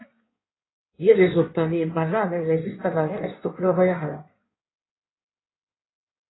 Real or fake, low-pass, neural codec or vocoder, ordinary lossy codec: fake; 7.2 kHz; codec, 16 kHz in and 24 kHz out, 1.1 kbps, FireRedTTS-2 codec; AAC, 16 kbps